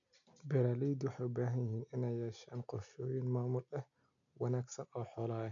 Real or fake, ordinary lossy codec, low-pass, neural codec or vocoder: real; none; 7.2 kHz; none